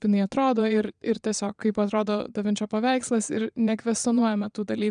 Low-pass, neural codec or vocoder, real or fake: 9.9 kHz; vocoder, 22.05 kHz, 80 mel bands, Vocos; fake